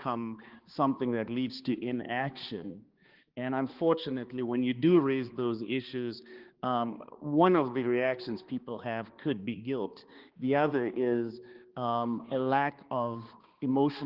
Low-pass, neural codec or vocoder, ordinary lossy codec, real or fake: 5.4 kHz; codec, 16 kHz, 2 kbps, X-Codec, HuBERT features, trained on balanced general audio; Opus, 16 kbps; fake